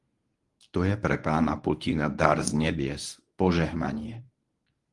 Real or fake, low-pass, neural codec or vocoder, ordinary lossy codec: fake; 10.8 kHz; codec, 24 kHz, 0.9 kbps, WavTokenizer, medium speech release version 1; Opus, 24 kbps